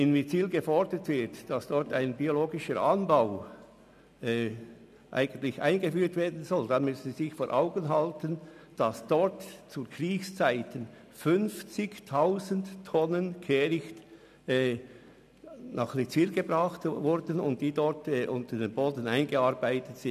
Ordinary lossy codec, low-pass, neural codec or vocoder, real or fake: none; 14.4 kHz; none; real